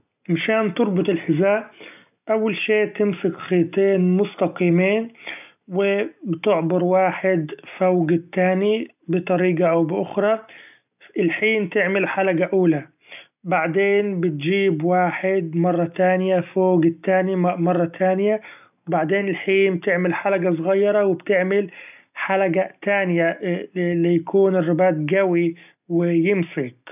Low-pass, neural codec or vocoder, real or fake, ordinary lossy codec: 3.6 kHz; none; real; none